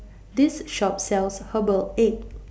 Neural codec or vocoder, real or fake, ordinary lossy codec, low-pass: none; real; none; none